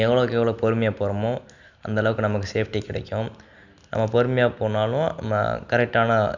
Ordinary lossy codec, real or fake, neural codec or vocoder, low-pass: none; real; none; 7.2 kHz